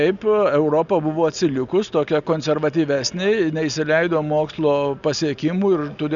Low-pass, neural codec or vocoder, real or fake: 7.2 kHz; none; real